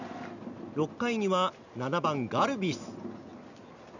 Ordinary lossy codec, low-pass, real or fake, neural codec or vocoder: none; 7.2 kHz; real; none